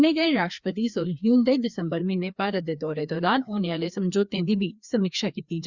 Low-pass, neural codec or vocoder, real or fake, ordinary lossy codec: 7.2 kHz; codec, 16 kHz, 2 kbps, FreqCodec, larger model; fake; Opus, 64 kbps